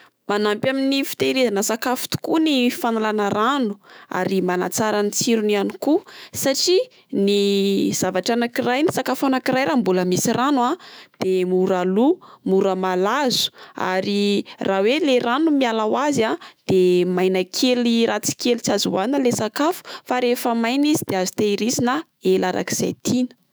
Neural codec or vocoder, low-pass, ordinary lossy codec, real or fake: autoencoder, 48 kHz, 128 numbers a frame, DAC-VAE, trained on Japanese speech; none; none; fake